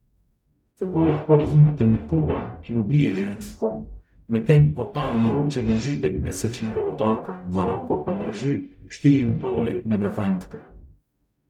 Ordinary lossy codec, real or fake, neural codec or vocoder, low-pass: none; fake; codec, 44.1 kHz, 0.9 kbps, DAC; 19.8 kHz